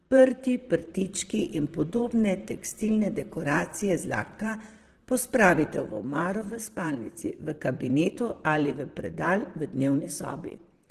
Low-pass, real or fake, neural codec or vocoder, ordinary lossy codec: 14.4 kHz; fake; vocoder, 44.1 kHz, 128 mel bands, Pupu-Vocoder; Opus, 16 kbps